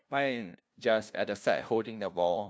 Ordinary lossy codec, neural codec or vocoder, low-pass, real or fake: none; codec, 16 kHz, 0.5 kbps, FunCodec, trained on LibriTTS, 25 frames a second; none; fake